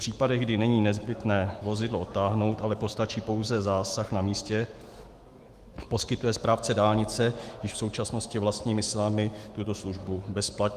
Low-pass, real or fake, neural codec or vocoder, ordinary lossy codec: 14.4 kHz; fake; autoencoder, 48 kHz, 128 numbers a frame, DAC-VAE, trained on Japanese speech; Opus, 24 kbps